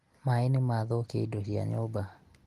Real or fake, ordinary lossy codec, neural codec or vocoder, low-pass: real; Opus, 24 kbps; none; 14.4 kHz